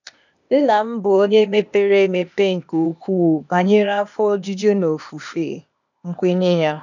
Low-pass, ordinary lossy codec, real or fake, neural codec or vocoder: 7.2 kHz; none; fake; codec, 16 kHz, 0.8 kbps, ZipCodec